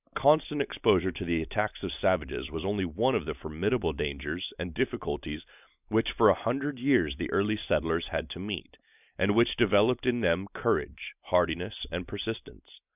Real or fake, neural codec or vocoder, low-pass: real; none; 3.6 kHz